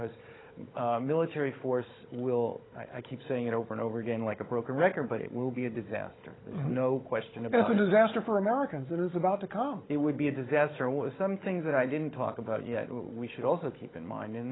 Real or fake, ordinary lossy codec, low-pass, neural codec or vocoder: fake; AAC, 16 kbps; 7.2 kHz; codec, 16 kHz, 16 kbps, FunCodec, trained on Chinese and English, 50 frames a second